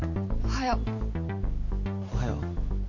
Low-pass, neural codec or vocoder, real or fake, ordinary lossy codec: 7.2 kHz; none; real; none